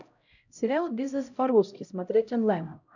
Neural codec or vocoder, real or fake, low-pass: codec, 16 kHz, 0.5 kbps, X-Codec, HuBERT features, trained on LibriSpeech; fake; 7.2 kHz